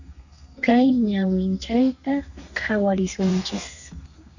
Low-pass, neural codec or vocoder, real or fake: 7.2 kHz; codec, 32 kHz, 1.9 kbps, SNAC; fake